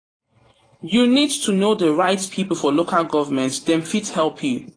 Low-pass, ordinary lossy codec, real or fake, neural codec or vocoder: 9.9 kHz; AAC, 32 kbps; fake; vocoder, 44.1 kHz, 128 mel bands every 512 samples, BigVGAN v2